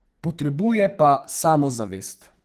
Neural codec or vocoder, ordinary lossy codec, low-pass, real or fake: codec, 44.1 kHz, 2.6 kbps, SNAC; Opus, 24 kbps; 14.4 kHz; fake